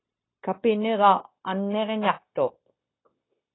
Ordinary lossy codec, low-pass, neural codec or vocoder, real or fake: AAC, 16 kbps; 7.2 kHz; codec, 16 kHz, 0.9 kbps, LongCat-Audio-Codec; fake